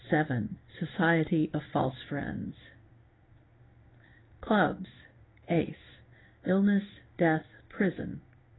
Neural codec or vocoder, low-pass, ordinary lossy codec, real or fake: codec, 16 kHz in and 24 kHz out, 1 kbps, XY-Tokenizer; 7.2 kHz; AAC, 16 kbps; fake